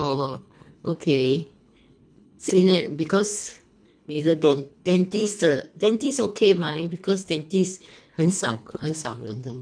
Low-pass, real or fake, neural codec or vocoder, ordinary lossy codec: 9.9 kHz; fake; codec, 24 kHz, 1.5 kbps, HILCodec; none